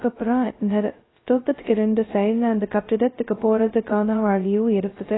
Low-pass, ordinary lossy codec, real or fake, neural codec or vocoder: 7.2 kHz; AAC, 16 kbps; fake; codec, 16 kHz, 0.2 kbps, FocalCodec